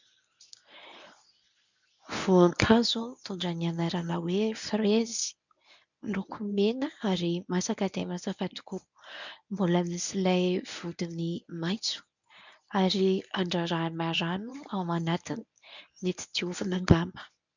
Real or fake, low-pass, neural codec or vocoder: fake; 7.2 kHz; codec, 24 kHz, 0.9 kbps, WavTokenizer, medium speech release version 1